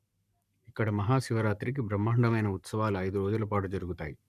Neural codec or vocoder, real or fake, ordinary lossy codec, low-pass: codec, 44.1 kHz, 7.8 kbps, Pupu-Codec; fake; none; 14.4 kHz